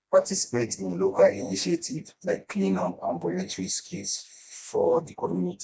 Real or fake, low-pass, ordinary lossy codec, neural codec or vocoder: fake; none; none; codec, 16 kHz, 1 kbps, FreqCodec, smaller model